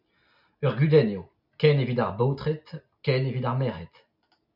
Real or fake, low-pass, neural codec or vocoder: real; 5.4 kHz; none